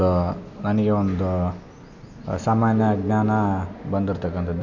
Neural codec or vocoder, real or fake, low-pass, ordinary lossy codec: none; real; 7.2 kHz; none